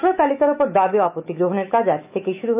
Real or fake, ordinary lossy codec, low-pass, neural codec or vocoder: fake; none; 3.6 kHz; autoencoder, 48 kHz, 128 numbers a frame, DAC-VAE, trained on Japanese speech